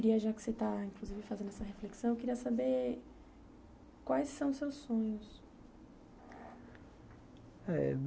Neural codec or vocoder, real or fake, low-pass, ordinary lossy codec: none; real; none; none